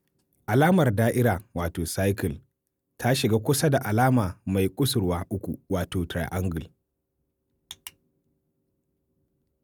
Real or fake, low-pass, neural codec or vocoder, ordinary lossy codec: real; none; none; none